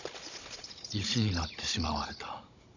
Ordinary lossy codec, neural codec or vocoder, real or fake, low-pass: none; codec, 16 kHz, 16 kbps, FunCodec, trained on Chinese and English, 50 frames a second; fake; 7.2 kHz